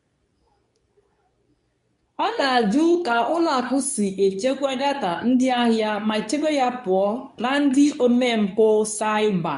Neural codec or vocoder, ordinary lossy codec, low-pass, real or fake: codec, 24 kHz, 0.9 kbps, WavTokenizer, medium speech release version 2; MP3, 64 kbps; 10.8 kHz; fake